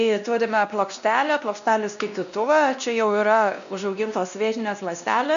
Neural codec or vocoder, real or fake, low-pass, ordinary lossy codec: codec, 16 kHz, 1 kbps, X-Codec, WavLM features, trained on Multilingual LibriSpeech; fake; 7.2 kHz; AAC, 96 kbps